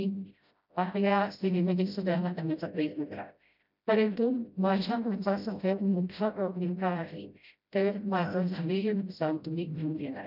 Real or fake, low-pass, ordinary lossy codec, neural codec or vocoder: fake; 5.4 kHz; none; codec, 16 kHz, 0.5 kbps, FreqCodec, smaller model